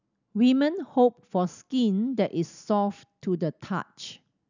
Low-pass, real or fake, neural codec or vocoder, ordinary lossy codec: 7.2 kHz; real; none; none